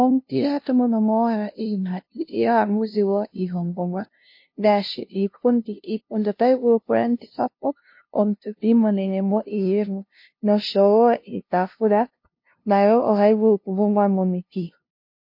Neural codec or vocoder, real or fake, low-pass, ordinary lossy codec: codec, 16 kHz, 0.5 kbps, FunCodec, trained on LibriTTS, 25 frames a second; fake; 5.4 kHz; MP3, 32 kbps